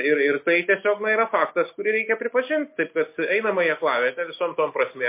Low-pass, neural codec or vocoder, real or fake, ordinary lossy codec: 3.6 kHz; none; real; MP3, 24 kbps